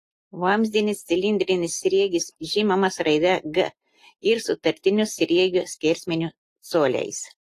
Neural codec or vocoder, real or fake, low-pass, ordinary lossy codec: none; real; 14.4 kHz; AAC, 48 kbps